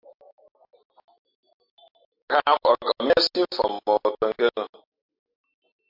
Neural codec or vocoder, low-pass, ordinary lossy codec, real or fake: none; 5.4 kHz; MP3, 48 kbps; real